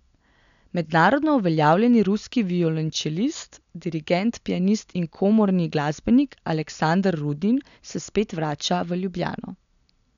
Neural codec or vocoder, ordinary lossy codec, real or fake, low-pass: none; none; real; 7.2 kHz